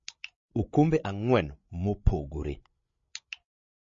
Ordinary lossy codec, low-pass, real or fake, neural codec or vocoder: MP3, 32 kbps; 7.2 kHz; fake; codec, 16 kHz, 16 kbps, FreqCodec, larger model